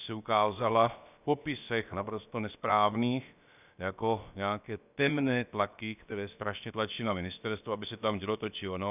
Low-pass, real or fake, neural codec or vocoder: 3.6 kHz; fake; codec, 16 kHz, about 1 kbps, DyCAST, with the encoder's durations